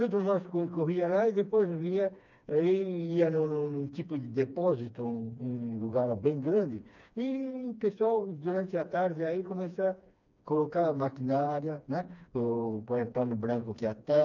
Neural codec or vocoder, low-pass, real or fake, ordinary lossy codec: codec, 16 kHz, 2 kbps, FreqCodec, smaller model; 7.2 kHz; fake; none